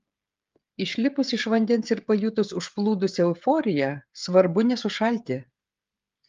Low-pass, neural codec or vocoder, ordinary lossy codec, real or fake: 7.2 kHz; codec, 16 kHz, 16 kbps, FreqCodec, smaller model; Opus, 32 kbps; fake